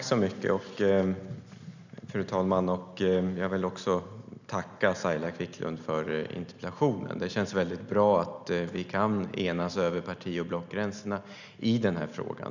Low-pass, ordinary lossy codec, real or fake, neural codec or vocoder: 7.2 kHz; none; real; none